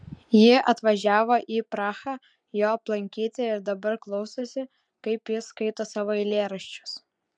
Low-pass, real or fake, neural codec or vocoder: 9.9 kHz; fake; vocoder, 24 kHz, 100 mel bands, Vocos